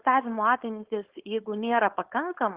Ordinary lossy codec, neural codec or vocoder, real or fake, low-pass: Opus, 16 kbps; codec, 16 kHz, 4.8 kbps, FACodec; fake; 3.6 kHz